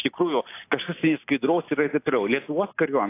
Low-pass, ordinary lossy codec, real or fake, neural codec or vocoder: 3.6 kHz; AAC, 24 kbps; real; none